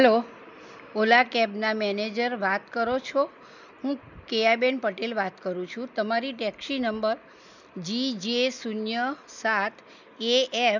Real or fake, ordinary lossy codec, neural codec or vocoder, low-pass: real; none; none; 7.2 kHz